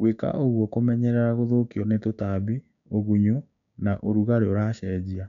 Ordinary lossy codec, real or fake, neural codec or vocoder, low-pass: none; fake; codec, 16 kHz, 6 kbps, DAC; 7.2 kHz